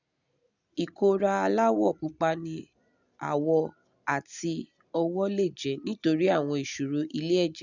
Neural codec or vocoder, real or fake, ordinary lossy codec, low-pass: none; real; none; 7.2 kHz